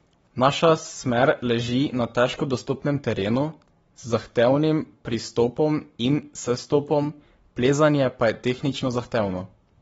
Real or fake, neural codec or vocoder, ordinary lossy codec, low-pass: fake; codec, 44.1 kHz, 7.8 kbps, Pupu-Codec; AAC, 24 kbps; 19.8 kHz